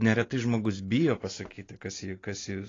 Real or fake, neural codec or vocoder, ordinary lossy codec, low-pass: real; none; AAC, 32 kbps; 7.2 kHz